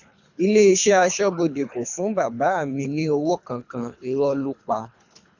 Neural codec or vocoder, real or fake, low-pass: codec, 24 kHz, 3 kbps, HILCodec; fake; 7.2 kHz